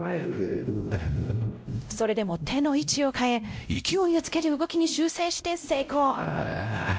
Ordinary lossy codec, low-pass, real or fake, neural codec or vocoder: none; none; fake; codec, 16 kHz, 0.5 kbps, X-Codec, WavLM features, trained on Multilingual LibriSpeech